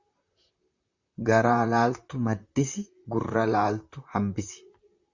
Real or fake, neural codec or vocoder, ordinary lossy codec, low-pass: fake; vocoder, 44.1 kHz, 128 mel bands, Pupu-Vocoder; Opus, 64 kbps; 7.2 kHz